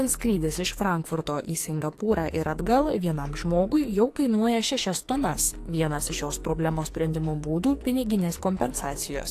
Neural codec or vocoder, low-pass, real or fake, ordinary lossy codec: codec, 44.1 kHz, 2.6 kbps, SNAC; 14.4 kHz; fake; AAC, 64 kbps